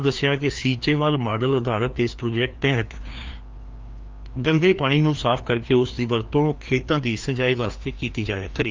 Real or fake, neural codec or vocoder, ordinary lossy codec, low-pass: fake; codec, 16 kHz, 2 kbps, FreqCodec, larger model; Opus, 24 kbps; 7.2 kHz